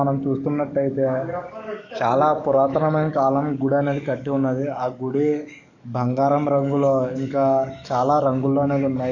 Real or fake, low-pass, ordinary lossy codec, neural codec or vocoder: fake; 7.2 kHz; none; codec, 44.1 kHz, 7.8 kbps, DAC